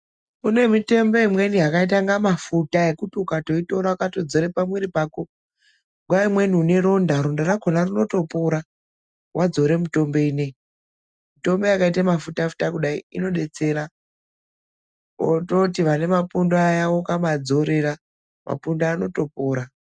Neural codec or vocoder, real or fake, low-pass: none; real; 9.9 kHz